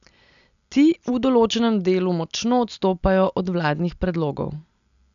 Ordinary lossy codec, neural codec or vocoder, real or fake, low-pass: none; none; real; 7.2 kHz